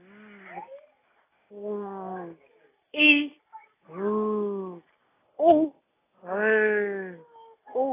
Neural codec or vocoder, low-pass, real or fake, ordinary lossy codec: none; 3.6 kHz; real; AAC, 16 kbps